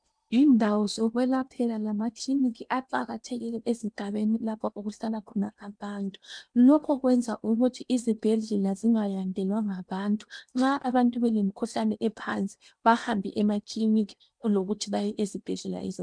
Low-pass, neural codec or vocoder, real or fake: 9.9 kHz; codec, 16 kHz in and 24 kHz out, 0.8 kbps, FocalCodec, streaming, 65536 codes; fake